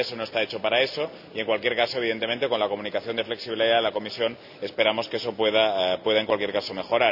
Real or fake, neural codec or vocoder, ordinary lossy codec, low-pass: real; none; none; 5.4 kHz